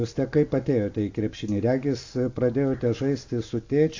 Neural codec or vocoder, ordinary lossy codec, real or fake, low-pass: none; AAC, 48 kbps; real; 7.2 kHz